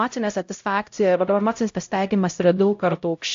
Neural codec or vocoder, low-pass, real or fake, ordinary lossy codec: codec, 16 kHz, 0.5 kbps, X-Codec, HuBERT features, trained on LibriSpeech; 7.2 kHz; fake; AAC, 48 kbps